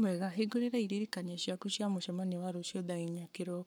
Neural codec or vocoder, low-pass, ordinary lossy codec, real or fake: codec, 44.1 kHz, 7.8 kbps, Pupu-Codec; 19.8 kHz; none; fake